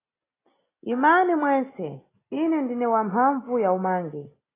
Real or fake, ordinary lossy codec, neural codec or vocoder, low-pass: real; AAC, 16 kbps; none; 3.6 kHz